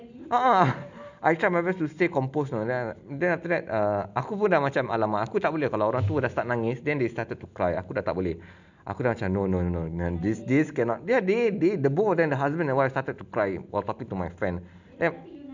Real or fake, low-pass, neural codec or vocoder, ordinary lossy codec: real; 7.2 kHz; none; none